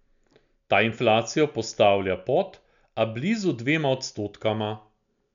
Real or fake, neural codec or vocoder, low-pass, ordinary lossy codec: real; none; 7.2 kHz; none